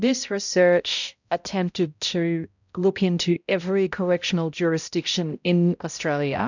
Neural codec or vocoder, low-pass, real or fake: codec, 16 kHz, 0.5 kbps, X-Codec, HuBERT features, trained on balanced general audio; 7.2 kHz; fake